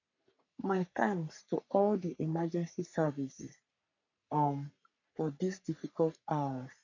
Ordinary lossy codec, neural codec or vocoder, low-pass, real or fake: none; codec, 44.1 kHz, 3.4 kbps, Pupu-Codec; 7.2 kHz; fake